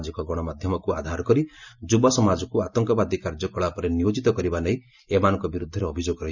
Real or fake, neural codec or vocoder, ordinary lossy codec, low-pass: real; none; none; 7.2 kHz